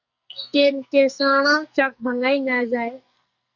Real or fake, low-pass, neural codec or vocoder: fake; 7.2 kHz; codec, 44.1 kHz, 2.6 kbps, SNAC